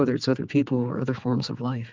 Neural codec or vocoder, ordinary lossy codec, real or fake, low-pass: codec, 16 kHz, 4 kbps, X-Codec, HuBERT features, trained on general audio; Opus, 24 kbps; fake; 7.2 kHz